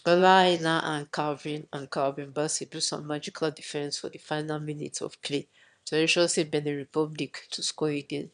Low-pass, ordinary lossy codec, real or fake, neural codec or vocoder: 9.9 kHz; none; fake; autoencoder, 22.05 kHz, a latent of 192 numbers a frame, VITS, trained on one speaker